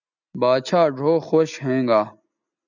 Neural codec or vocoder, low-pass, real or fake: none; 7.2 kHz; real